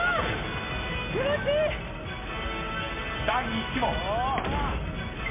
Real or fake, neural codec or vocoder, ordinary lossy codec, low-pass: real; none; MP3, 32 kbps; 3.6 kHz